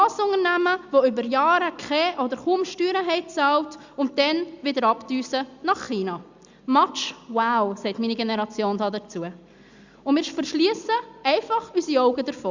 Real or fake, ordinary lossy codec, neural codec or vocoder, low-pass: real; Opus, 64 kbps; none; 7.2 kHz